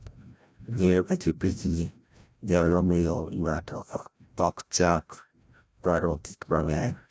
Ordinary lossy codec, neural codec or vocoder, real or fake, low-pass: none; codec, 16 kHz, 0.5 kbps, FreqCodec, larger model; fake; none